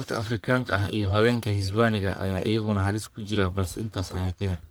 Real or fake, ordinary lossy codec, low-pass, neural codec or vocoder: fake; none; none; codec, 44.1 kHz, 1.7 kbps, Pupu-Codec